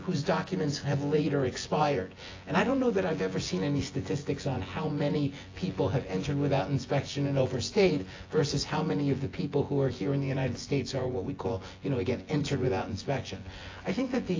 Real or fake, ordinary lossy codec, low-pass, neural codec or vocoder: fake; AAC, 32 kbps; 7.2 kHz; vocoder, 24 kHz, 100 mel bands, Vocos